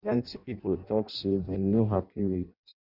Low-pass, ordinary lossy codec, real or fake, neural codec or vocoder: 5.4 kHz; none; fake; codec, 16 kHz in and 24 kHz out, 0.6 kbps, FireRedTTS-2 codec